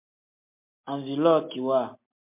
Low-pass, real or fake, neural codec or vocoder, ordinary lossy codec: 3.6 kHz; real; none; MP3, 32 kbps